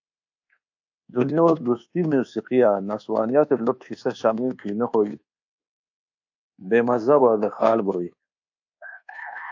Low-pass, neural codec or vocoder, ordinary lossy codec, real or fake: 7.2 kHz; codec, 24 kHz, 1.2 kbps, DualCodec; AAC, 48 kbps; fake